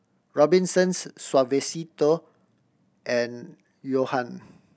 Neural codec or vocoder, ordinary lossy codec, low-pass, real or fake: none; none; none; real